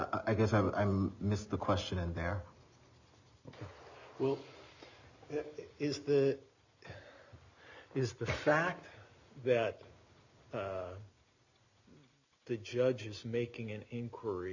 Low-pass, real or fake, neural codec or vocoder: 7.2 kHz; real; none